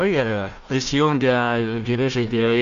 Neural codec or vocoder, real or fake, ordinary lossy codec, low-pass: codec, 16 kHz, 1 kbps, FunCodec, trained on Chinese and English, 50 frames a second; fake; AAC, 96 kbps; 7.2 kHz